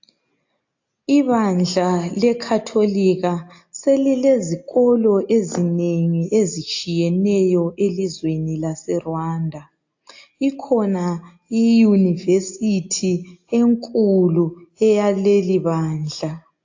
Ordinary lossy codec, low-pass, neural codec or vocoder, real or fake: AAC, 48 kbps; 7.2 kHz; none; real